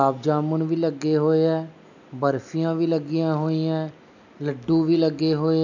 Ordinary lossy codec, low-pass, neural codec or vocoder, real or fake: none; 7.2 kHz; none; real